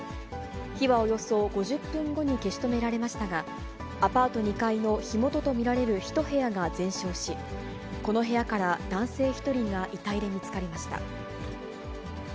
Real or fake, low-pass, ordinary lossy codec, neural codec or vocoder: real; none; none; none